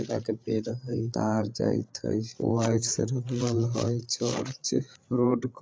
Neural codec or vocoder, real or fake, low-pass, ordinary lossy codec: codec, 16 kHz, 8 kbps, FreqCodec, larger model; fake; none; none